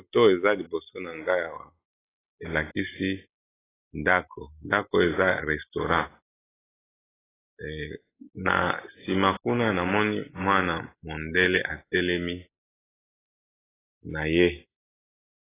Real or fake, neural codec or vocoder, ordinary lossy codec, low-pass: real; none; AAC, 16 kbps; 3.6 kHz